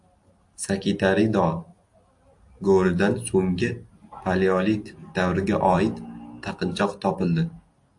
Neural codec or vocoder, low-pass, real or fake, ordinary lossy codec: none; 10.8 kHz; real; AAC, 64 kbps